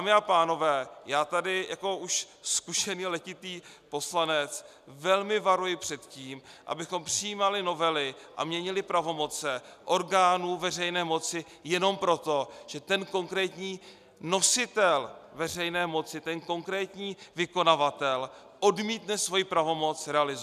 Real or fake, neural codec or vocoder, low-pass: real; none; 14.4 kHz